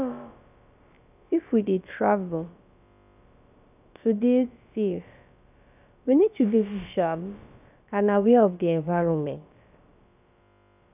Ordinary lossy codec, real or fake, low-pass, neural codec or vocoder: none; fake; 3.6 kHz; codec, 16 kHz, about 1 kbps, DyCAST, with the encoder's durations